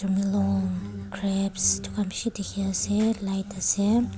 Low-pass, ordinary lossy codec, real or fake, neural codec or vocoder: none; none; real; none